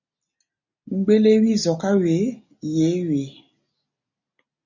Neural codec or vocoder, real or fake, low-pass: none; real; 7.2 kHz